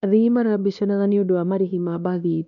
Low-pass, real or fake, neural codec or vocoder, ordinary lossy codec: 7.2 kHz; fake; codec, 16 kHz, 1 kbps, X-Codec, WavLM features, trained on Multilingual LibriSpeech; none